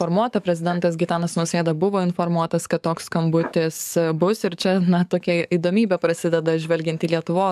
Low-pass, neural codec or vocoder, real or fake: 14.4 kHz; codec, 44.1 kHz, 7.8 kbps, DAC; fake